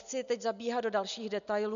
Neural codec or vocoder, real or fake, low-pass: none; real; 7.2 kHz